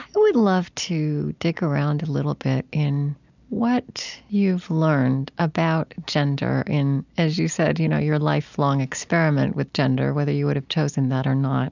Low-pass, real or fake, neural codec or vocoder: 7.2 kHz; real; none